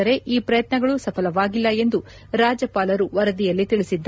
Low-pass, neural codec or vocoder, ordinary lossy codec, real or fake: none; none; none; real